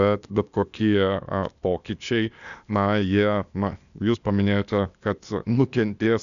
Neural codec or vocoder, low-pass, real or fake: codec, 16 kHz, 0.8 kbps, ZipCodec; 7.2 kHz; fake